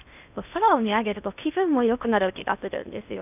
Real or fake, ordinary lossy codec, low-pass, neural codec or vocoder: fake; none; 3.6 kHz; codec, 16 kHz in and 24 kHz out, 0.8 kbps, FocalCodec, streaming, 65536 codes